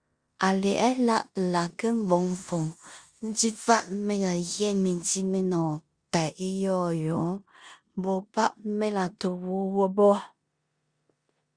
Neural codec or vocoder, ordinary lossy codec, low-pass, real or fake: codec, 16 kHz in and 24 kHz out, 0.9 kbps, LongCat-Audio-Codec, four codebook decoder; Opus, 64 kbps; 9.9 kHz; fake